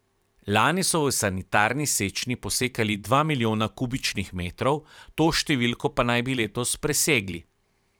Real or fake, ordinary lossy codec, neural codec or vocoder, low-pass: fake; none; vocoder, 44.1 kHz, 128 mel bands every 512 samples, BigVGAN v2; none